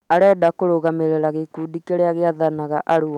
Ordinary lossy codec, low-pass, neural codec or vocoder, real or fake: none; 19.8 kHz; autoencoder, 48 kHz, 128 numbers a frame, DAC-VAE, trained on Japanese speech; fake